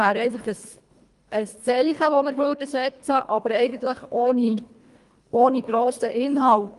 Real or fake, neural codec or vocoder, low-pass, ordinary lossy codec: fake; codec, 24 kHz, 1.5 kbps, HILCodec; 10.8 kHz; Opus, 32 kbps